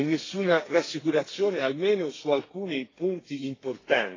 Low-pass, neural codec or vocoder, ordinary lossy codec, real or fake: 7.2 kHz; codec, 32 kHz, 1.9 kbps, SNAC; AAC, 32 kbps; fake